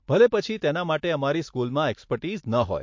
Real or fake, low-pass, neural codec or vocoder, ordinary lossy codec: real; 7.2 kHz; none; MP3, 48 kbps